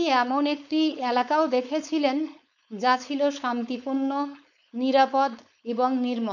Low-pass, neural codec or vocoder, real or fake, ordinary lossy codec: 7.2 kHz; codec, 16 kHz, 4.8 kbps, FACodec; fake; none